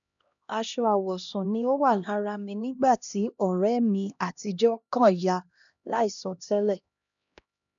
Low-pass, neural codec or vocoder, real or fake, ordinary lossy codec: 7.2 kHz; codec, 16 kHz, 1 kbps, X-Codec, HuBERT features, trained on LibriSpeech; fake; none